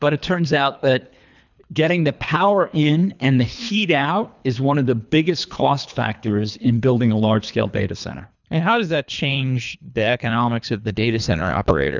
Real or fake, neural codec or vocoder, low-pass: fake; codec, 24 kHz, 3 kbps, HILCodec; 7.2 kHz